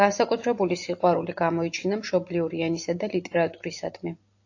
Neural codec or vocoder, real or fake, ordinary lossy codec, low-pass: none; real; AAC, 48 kbps; 7.2 kHz